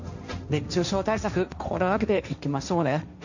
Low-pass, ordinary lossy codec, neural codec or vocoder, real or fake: 7.2 kHz; none; codec, 16 kHz, 1.1 kbps, Voila-Tokenizer; fake